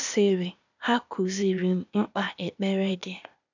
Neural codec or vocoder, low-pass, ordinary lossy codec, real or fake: codec, 16 kHz, 0.8 kbps, ZipCodec; 7.2 kHz; none; fake